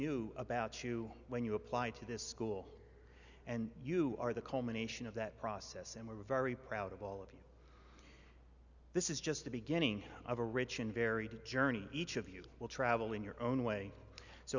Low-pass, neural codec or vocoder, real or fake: 7.2 kHz; none; real